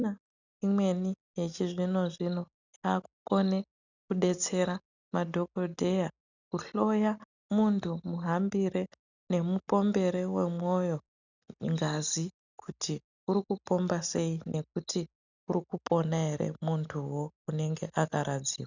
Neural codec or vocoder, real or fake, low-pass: none; real; 7.2 kHz